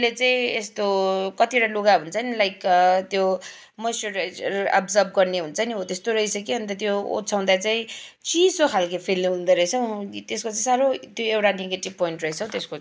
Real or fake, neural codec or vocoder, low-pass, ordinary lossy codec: real; none; none; none